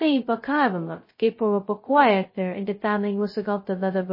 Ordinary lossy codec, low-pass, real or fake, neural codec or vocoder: MP3, 24 kbps; 5.4 kHz; fake; codec, 16 kHz, 0.2 kbps, FocalCodec